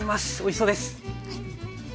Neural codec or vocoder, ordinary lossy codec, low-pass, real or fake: none; none; none; real